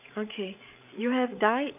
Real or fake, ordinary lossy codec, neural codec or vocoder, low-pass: fake; none; codec, 16 kHz, 8 kbps, FreqCodec, larger model; 3.6 kHz